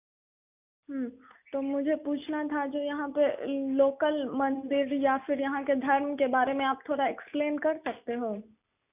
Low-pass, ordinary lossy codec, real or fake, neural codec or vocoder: 3.6 kHz; none; real; none